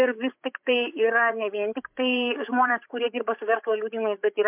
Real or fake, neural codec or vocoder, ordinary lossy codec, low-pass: fake; codec, 16 kHz, 8 kbps, FreqCodec, larger model; MP3, 32 kbps; 3.6 kHz